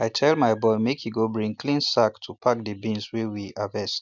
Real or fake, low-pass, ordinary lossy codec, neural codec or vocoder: real; 7.2 kHz; none; none